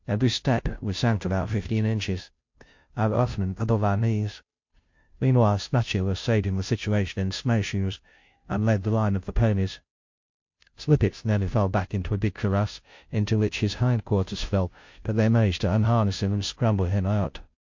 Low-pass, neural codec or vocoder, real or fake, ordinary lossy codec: 7.2 kHz; codec, 16 kHz, 0.5 kbps, FunCodec, trained on Chinese and English, 25 frames a second; fake; MP3, 48 kbps